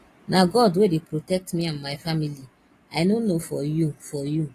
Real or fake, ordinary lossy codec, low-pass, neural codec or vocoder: real; AAC, 48 kbps; 14.4 kHz; none